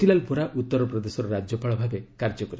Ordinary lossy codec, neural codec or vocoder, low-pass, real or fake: none; none; none; real